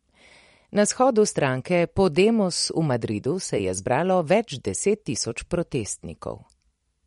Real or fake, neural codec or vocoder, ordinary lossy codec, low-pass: fake; vocoder, 44.1 kHz, 128 mel bands every 256 samples, BigVGAN v2; MP3, 48 kbps; 19.8 kHz